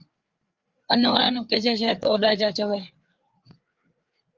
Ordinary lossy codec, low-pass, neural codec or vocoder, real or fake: Opus, 32 kbps; 7.2 kHz; codec, 16 kHz, 4 kbps, FreqCodec, larger model; fake